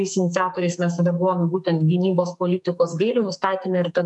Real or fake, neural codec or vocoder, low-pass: fake; codec, 44.1 kHz, 2.6 kbps, SNAC; 10.8 kHz